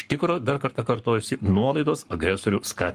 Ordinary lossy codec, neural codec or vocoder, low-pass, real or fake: Opus, 32 kbps; codec, 44.1 kHz, 7.8 kbps, Pupu-Codec; 14.4 kHz; fake